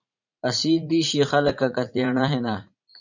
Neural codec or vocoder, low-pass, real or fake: vocoder, 44.1 kHz, 80 mel bands, Vocos; 7.2 kHz; fake